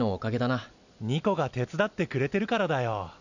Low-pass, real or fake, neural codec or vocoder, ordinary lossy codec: 7.2 kHz; real; none; none